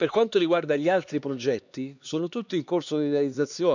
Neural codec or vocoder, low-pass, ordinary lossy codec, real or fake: codec, 16 kHz, 2 kbps, X-Codec, HuBERT features, trained on LibriSpeech; 7.2 kHz; none; fake